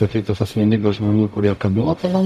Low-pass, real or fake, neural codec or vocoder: 14.4 kHz; fake; codec, 44.1 kHz, 0.9 kbps, DAC